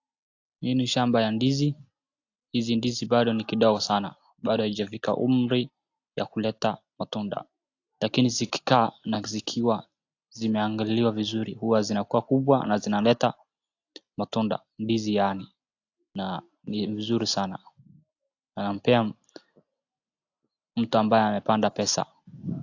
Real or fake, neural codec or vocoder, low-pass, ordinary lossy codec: real; none; 7.2 kHz; AAC, 48 kbps